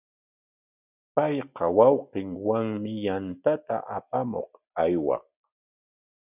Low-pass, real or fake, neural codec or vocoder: 3.6 kHz; real; none